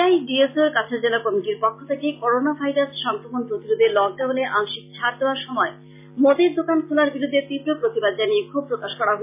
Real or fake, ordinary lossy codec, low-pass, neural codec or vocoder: real; MP3, 24 kbps; 3.6 kHz; none